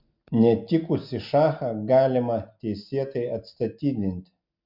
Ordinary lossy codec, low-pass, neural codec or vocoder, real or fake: MP3, 48 kbps; 5.4 kHz; none; real